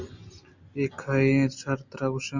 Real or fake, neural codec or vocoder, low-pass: real; none; 7.2 kHz